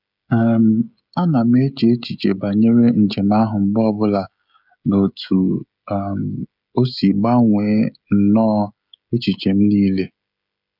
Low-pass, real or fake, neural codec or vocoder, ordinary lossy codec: 5.4 kHz; fake; codec, 16 kHz, 16 kbps, FreqCodec, smaller model; none